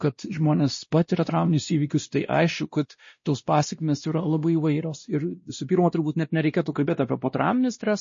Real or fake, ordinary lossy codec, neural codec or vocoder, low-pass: fake; MP3, 32 kbps; codec, 16 kHz, 1 kbps, X-Codec, WavLM features, trained on Multilingual LibriSpeech; 7.2 kHz